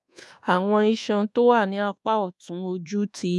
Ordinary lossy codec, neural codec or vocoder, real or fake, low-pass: none; codec, 24 kHz, 1.2 kbps, DualCodec; fake; none